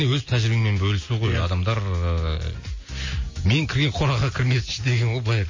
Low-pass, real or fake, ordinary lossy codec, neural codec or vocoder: 7.2 kHz; real; MP3, 32 kbps; none